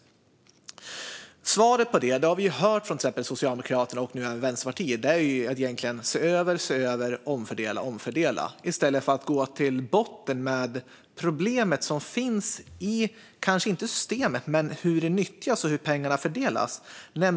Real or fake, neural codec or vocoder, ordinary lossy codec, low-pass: real; none; none; none